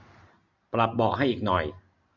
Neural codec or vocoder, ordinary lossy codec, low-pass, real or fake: none; none; 7.2 kHz; real